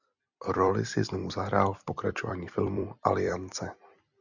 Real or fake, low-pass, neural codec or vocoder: fake; 7.2 kHz; vocoder, 44.1 kHz, 128 mel bands every 256 samples, BigVGAN v2